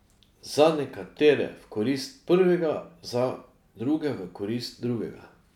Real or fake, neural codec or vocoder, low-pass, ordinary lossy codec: real; none; 19.8 kHz; none